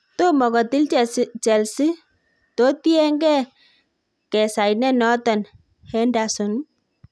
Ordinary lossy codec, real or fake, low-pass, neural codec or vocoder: none; real; none; none